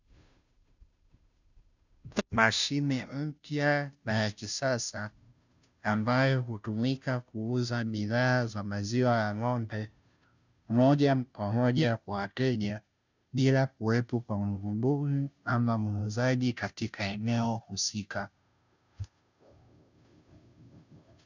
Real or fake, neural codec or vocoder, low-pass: fake; codec, 16 kHz, 0.5 kbps, FunCodec, trained on Chinese and English, 25 frames a second; 7.2 kHz